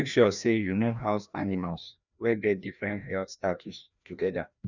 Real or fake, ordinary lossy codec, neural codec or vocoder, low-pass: fake; none; codec, 16 kHz, 1 kbps, FreqCodec, larger model; 7.2 kHz